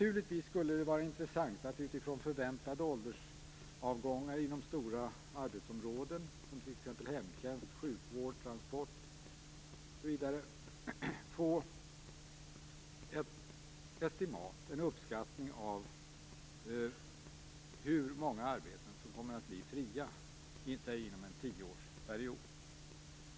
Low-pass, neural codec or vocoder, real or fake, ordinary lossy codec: none; none; real; none